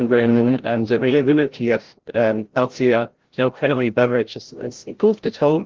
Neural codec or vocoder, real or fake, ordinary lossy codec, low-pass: codec, 16 kHz, 0.5 kbps, FreqCodec, larger model; fake; Opus, 16 kbps; 7.2 kHz